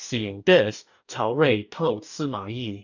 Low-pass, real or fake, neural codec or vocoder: 7.2 kHz; fake; codec, 44.1 kHz, 2.6 kbps, DAC